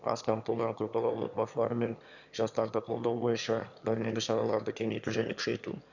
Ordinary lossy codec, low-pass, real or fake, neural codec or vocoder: none; 7.2 kHz; fake; autoencoder, 22.05 kHz, a latent of 192 numbers a frame, VITS, trained on one speaker